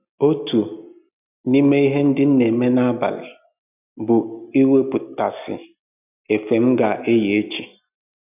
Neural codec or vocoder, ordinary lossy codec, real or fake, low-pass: none; none; real; 3.6 kHz